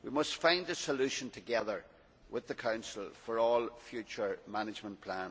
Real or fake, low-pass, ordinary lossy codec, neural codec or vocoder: real; none; none; none